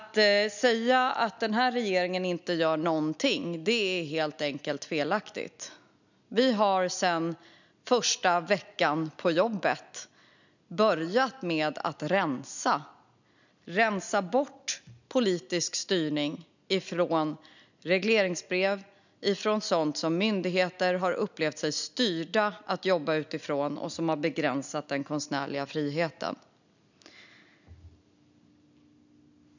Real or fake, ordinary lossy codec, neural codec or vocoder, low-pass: real; none; none; 7.2 kHz